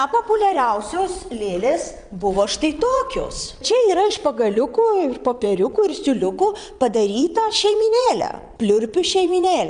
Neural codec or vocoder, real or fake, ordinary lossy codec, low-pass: vocoder, 22.05 kHz, 80 mel bands, Vocos; fake; Opus, 64 kbps; 9.9 kHz